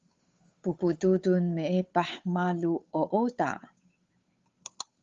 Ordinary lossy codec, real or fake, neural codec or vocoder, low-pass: Opus, 32 kbps; fake; codec, 16 kHz, 8 kbps, FunCodec, trained on Chinese and English, 25 frames a second; 7.2 kHz